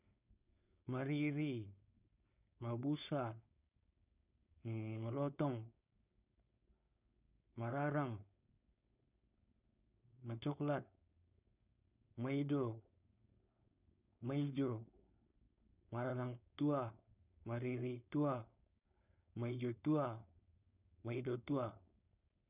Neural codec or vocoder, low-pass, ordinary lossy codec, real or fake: codec, 16 kHz, 4.8 kbps, FACodec; 3.6 kHz; none; fake